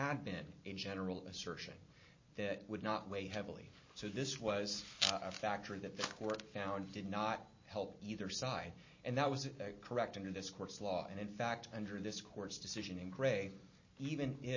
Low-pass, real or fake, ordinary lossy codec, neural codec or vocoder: 7.2 kHz; real; MP3, 32 kbps; none